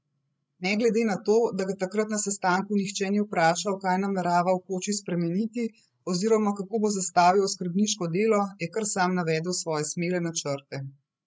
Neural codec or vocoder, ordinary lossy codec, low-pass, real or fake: codec, 16 kHz, 16 kbps, FreqCodec, larger model; none; none; fake